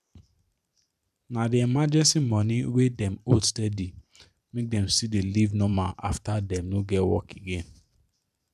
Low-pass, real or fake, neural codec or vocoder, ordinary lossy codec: 14.4 kHz; fake; vocoder, 44.1 kHz, 128 mel bands every 512 samples, BigVGAN v2; none